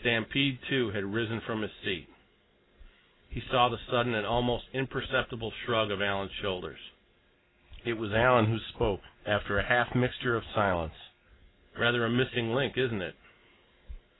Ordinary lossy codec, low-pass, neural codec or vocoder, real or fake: AAC, 16 kbps; 7.2 kHz; none; real